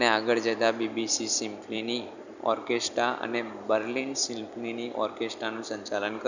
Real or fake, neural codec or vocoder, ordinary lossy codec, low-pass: fake; vocoder, 44.1 kHz, 128 mel bands every 256 samples, BigVGAN v2; none; 7.2 kHz